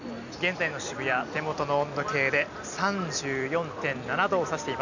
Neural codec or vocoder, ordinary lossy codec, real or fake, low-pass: none; none; real; 7.2 kHz